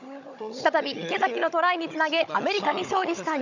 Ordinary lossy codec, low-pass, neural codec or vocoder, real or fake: none; 7.2 kHz; codec, 16 kHz, 16 kbps, FunCodec, trained on Chinese and English, 50 frames a second; fake